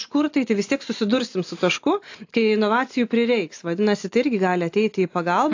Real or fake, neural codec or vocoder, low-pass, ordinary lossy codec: real; none; 7.2 kHz; AAC, 48 kbps